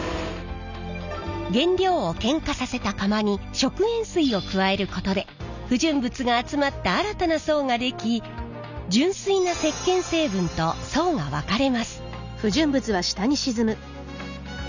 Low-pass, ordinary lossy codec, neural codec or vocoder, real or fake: 7.2 kHz; none; none; real